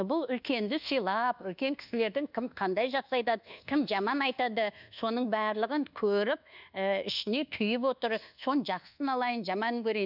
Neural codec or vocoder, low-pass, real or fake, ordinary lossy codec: codec, 24 kHz, 1.2 kbps, DualCodec; 5.4 kHz; fake; none